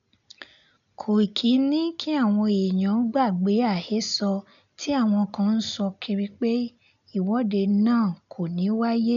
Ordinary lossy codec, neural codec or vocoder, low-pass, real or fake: none; none; 7.2 kHz; real